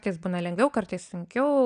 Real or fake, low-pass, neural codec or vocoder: real; 9.9 kHz; none